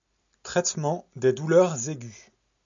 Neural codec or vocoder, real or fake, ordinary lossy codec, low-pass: none; real; MP3, 64 kbps; 7.2 kHz